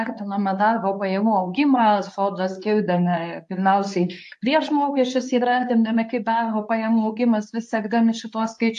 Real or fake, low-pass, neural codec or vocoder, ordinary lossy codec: fake; 10.8 kHz; codec, 24 kHz, 0.9 kbps, WavTokenizer, medium speech release version 2; AAC, 64 kbps